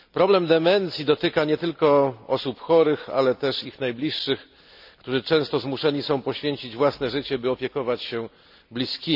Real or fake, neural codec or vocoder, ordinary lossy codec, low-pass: real; none; none; 5.4 kHz